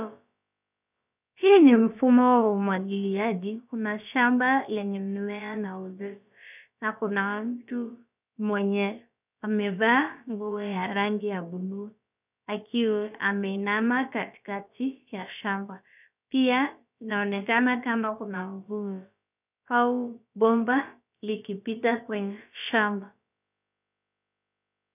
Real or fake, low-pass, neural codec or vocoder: fake; 3.6 kHz; codec, 16 kHz, about 1 kbps, DyCAST, with the encoder's durations